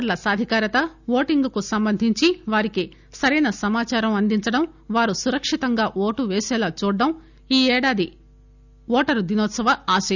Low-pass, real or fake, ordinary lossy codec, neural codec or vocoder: 7.2 kHz; real; none; none